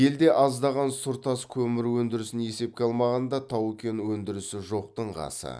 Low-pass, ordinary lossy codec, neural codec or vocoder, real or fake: none; none; none; real